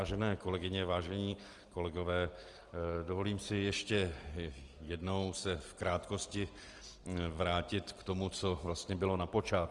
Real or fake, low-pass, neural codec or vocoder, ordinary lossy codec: real; 10.8 kHz; none; Opus, 24 kbps